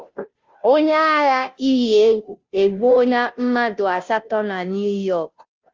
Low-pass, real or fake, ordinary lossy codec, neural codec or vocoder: 7.2 kHz; fake; Opus, 32 kbps; codec, 16 kHz, 0.5 kbps, FunCodec, trained on Chinese and English, 25 frames a second